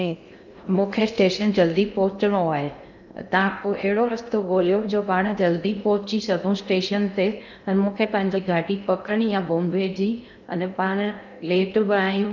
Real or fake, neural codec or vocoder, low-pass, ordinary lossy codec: fake; codec, 16 kHz in and 24 kHz out, 0.6 kbps, FocalCodec, streaming, 2048 codes; 7.2 kHz; none